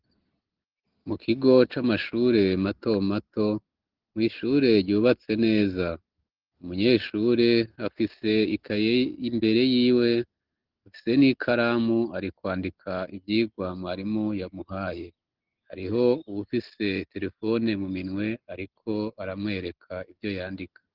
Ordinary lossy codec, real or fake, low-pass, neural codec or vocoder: Opus, 16 kbps; real; 5.4 kHz; none